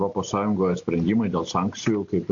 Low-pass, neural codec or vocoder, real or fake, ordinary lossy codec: 7.2 kHz; none; real; AAC, 48 kbps